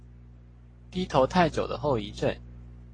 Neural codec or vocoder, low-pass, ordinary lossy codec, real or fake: none; 9.9 kHz; AAC, 32 kbps; real